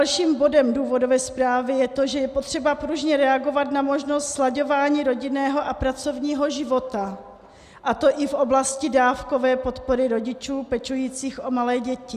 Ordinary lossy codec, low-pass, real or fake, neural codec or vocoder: Opus, 64 kbps; 14.4 kHz; real; none